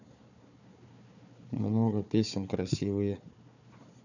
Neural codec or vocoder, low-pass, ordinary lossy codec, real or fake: codec, 16 kHz, 4 kbps, FunCodec, trained on Chinese and English, 50 frames a second; 7.2 kHz; none; fake